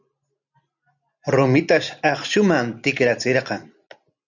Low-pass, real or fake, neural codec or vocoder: 7.2 kHz; real; none